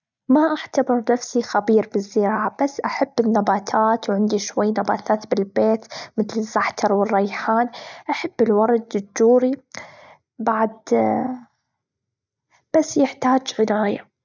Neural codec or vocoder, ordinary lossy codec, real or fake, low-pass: none; none; real; 7.2 kHz